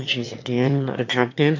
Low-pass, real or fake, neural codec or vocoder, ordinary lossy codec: 7.2 kHz; fake; autoencoder, 22.05 kHz, a latent of 192 numbers a frame, VITS, trained on one speaker; MP3, 48 kbps